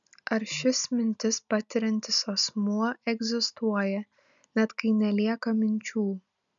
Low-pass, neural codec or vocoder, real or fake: 7.2 kHz; none; real